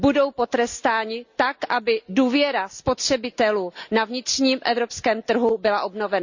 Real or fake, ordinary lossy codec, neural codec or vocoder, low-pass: real; Opus, 64 kbps; none; 7.2 kHz